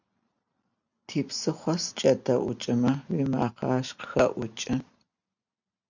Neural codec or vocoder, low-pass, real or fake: none; 7.2 kHz; real